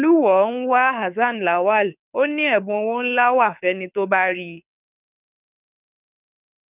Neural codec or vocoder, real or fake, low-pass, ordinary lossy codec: codec, 24 kHz, 6 kbps, HILCodec; fake; 3.6 kHz; none